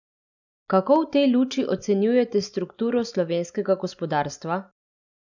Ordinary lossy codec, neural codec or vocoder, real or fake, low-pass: none; none; real; 7.2 kHz